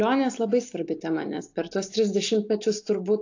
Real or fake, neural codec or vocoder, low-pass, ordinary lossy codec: real; none; 7.2 kHz; AAC, 48 kbps